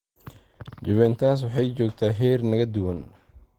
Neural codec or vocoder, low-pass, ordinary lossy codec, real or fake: none; 19.8 kHz; Opus, 16 kbps; real